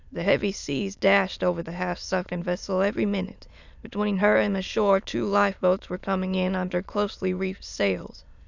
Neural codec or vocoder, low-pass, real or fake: autoencoder, 22.05 kHz, a latent of 192 numbers a frame, VITS, trained on many speakers; 7.2 kHz; fake